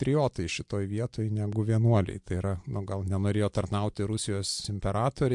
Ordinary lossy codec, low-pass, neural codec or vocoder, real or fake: MP3, 64 kbps; 10.8 kHz; none; real